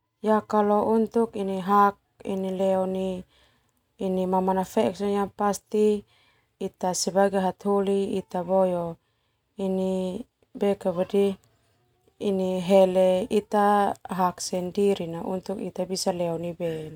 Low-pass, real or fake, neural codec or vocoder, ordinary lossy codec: 19.8 kHz; real; none; none